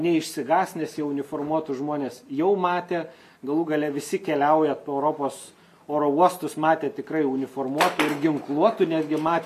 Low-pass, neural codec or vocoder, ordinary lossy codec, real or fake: 14.4 kHz; none; MP3, 64 kbps; real